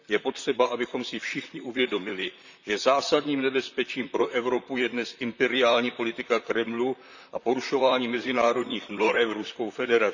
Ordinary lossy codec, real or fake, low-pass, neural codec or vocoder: none; fake; 7.2 kHz; vocoder, 44.1 kHz, 128 mel bands, Pupu-Vocoder